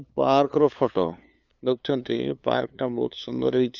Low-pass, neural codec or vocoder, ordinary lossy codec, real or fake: 7.2 kHz; codec, 16 kHz, 2 kbps, FunCodec, trained on LibriTTS, 25 frames a second; none; fake